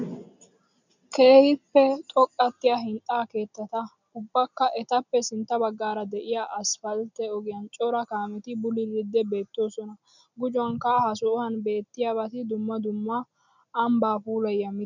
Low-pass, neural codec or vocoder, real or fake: 7.2 kHz; none; real